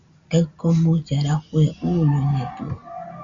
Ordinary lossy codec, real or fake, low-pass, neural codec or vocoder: Opus, 64 kbps; real; 7.2 kHz; none